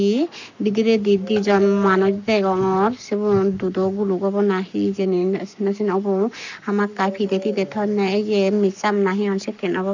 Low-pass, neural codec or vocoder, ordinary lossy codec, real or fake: 7.2 kHz; codec, 44.1 kHz, 7.8 kbps, Pupu-Codec; none; fake